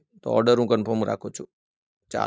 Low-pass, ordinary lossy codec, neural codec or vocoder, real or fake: none; none; none; real